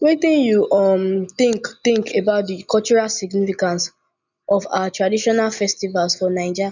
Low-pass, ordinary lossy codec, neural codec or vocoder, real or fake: 7.2 kHz; none; none; real